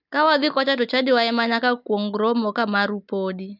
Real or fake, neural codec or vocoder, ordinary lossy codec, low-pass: real; none; none; 5.4 kHz